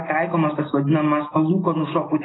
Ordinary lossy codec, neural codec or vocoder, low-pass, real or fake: AAC, 16 kbps; none; 7.2 kHz; real